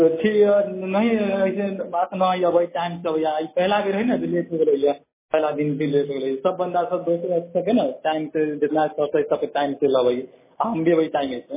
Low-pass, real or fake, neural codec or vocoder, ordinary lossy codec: 3.6 kHz; real; none; MP3, 16 kbps